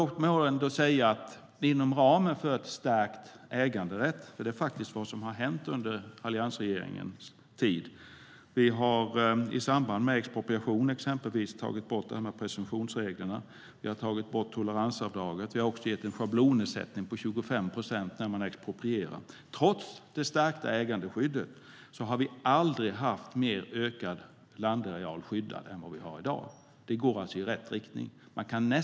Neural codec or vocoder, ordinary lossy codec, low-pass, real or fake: none; none; none; real